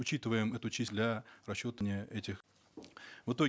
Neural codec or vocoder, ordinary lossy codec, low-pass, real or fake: none; none; none; real